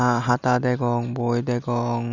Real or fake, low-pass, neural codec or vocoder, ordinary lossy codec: real; 7.2 kHz; none; none